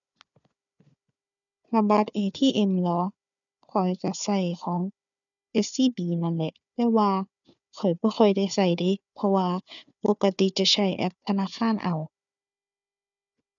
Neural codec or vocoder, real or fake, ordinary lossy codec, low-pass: codec, 16 kHz, 4 kbps, FunCodec, trained on Chinese and English, 50 frames a second; fake; none; 7.2 kHz